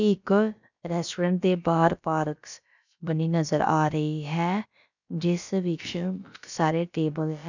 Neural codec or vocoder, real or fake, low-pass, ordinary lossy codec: codec, 16 kHz, about 1 kbps, DyCAST, with the encoder's durations; fake; 7.2 kHz; none